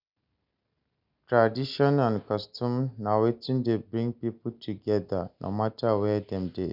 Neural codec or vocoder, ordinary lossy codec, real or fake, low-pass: none; none; real; 5.4 kHz